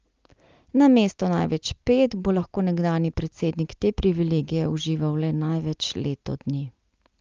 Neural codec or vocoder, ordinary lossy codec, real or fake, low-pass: none; Opus, 24 kbps; real; 7.2 kHz